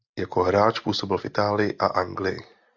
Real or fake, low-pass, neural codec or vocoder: real; 7.2 kHz; none